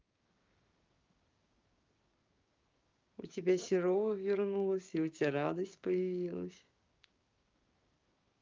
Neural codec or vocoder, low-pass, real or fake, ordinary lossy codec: codec, 16 kHz, 6 kbps, DAC; 7.2 kHz; fake; Opus, 32 kbps